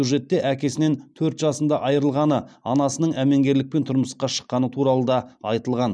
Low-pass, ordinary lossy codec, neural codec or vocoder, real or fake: none; none; none; real